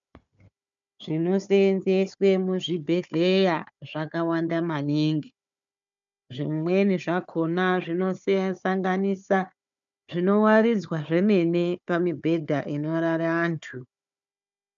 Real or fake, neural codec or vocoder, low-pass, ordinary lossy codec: fake; codec, 16 kHz, 4 kbps, FunCodec, trained on Chinese and English, 50 frames a second; 7.2 kHz; MP3, 96 kbps